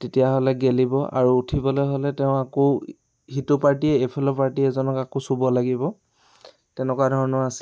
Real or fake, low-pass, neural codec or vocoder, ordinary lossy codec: real; none; none; none